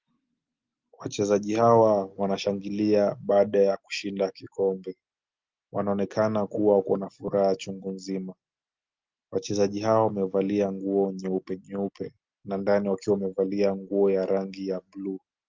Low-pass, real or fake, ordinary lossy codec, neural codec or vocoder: 7.2 kHz; real; Opus, 24 kbps; none